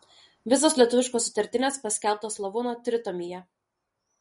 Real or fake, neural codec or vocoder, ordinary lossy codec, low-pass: real; none; MP3, 48 kbps; 10.8 kHz